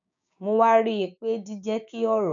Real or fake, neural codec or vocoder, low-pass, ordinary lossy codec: fake; codec, 16 kHz, 6 kbps, DAC; 7.2 kHz; none